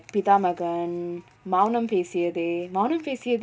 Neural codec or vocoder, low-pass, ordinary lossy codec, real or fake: none; none; none; real